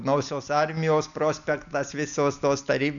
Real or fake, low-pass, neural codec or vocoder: real; 7.2 kHz; none